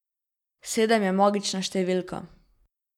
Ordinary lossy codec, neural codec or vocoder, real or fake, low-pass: none; none; real; 19.8 kHz